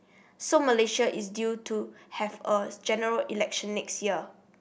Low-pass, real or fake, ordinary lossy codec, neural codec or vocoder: none; real; none; none